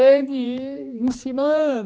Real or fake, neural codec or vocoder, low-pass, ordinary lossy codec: fake; codec, 16 kHz, 2 kbps, X-Codec, HuBERT features, trained on general audio; none; none